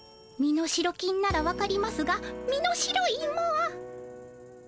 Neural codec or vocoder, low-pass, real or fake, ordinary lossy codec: none; none; real; none